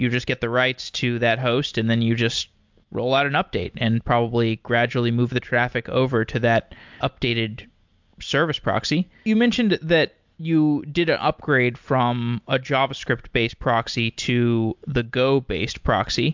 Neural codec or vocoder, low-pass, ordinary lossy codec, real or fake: none; 7.2 kHz; MP3, 64 kbps; real